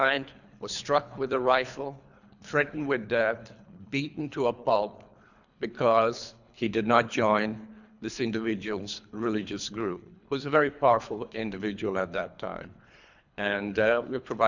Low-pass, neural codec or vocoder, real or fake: 7.2 kHz; codec, 24 kHz, 3 kbps, HILCodec; fake